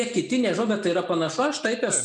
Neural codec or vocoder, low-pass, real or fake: none; 10.8 kHz; real